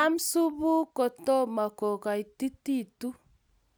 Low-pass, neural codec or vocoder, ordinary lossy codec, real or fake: none; none; none; real